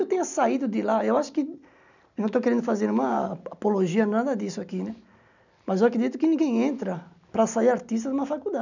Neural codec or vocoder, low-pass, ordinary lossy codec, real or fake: none; 7.2 kHz; none; real